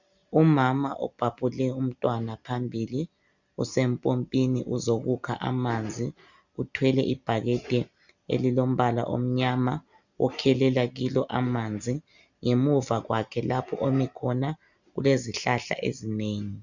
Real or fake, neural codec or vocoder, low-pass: real; none; 7.2 kHz